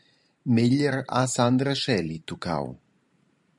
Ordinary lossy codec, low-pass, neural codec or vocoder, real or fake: MP3, 96 kbps; 10.8 kHz; vocoder, 44.1 kHz, 128 mel bands every 512 samples, BigVGAN v2; fake